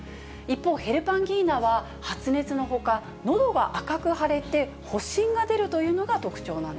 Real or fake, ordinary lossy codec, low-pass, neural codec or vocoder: real; none; none; none